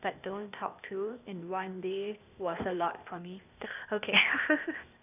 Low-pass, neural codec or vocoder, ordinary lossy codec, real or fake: 3.6 kHz; codec, 16 kHz, 0.8 kbps, ZipCodec; none; fake